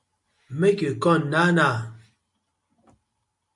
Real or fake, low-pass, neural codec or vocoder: real; 10.8 kHz; none